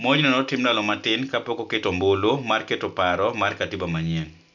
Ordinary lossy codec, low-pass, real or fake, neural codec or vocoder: none; 7.2 kHz; real; none